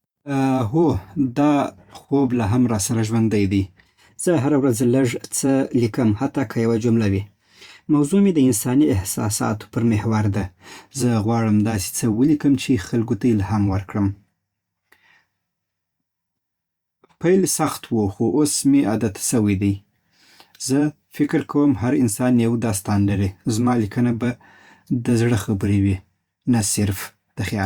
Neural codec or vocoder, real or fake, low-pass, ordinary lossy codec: vocoder, 44.1 kHz, 128 mel bands every 512 samples, BigVGAN v2; fake; 19.8 kHz; Opus, 64 kbps